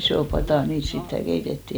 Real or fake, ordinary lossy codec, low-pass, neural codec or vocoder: real; none; none; none